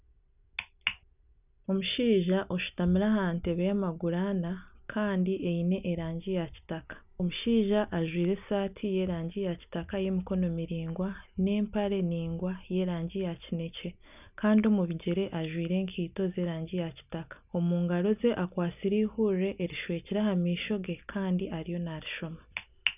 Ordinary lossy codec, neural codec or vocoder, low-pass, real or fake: none; none; 3.6 kHz; real